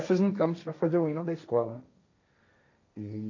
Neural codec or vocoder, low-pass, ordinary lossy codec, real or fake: codec, 16 kHz, 1.1 kbps, Voila-Tokenizer; 7.2 kHz; MP3, 48 kbps; fake